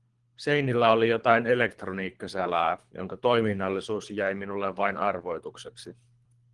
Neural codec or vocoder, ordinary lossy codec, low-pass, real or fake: codec, 24 kHz, 3 kbps, HILCodec; Opus, 24 kbps; 10.8 kHz; fake